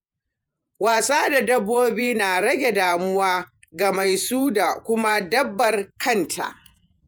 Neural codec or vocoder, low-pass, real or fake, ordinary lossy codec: vocoder, 48 kHz, 128 mel bands, Vocos; none; fake; none